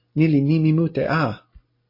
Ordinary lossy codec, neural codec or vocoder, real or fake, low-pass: MP3, 24 kbps; none; real; 5.4 kHz